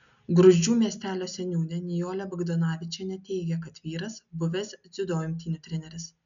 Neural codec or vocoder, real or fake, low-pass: none; real; 7.2 kHz